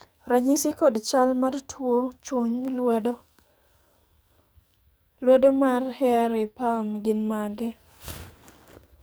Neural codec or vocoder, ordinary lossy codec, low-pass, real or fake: codec, 44.1 kHz, 2.6 kbps, SNAC; none; none; fake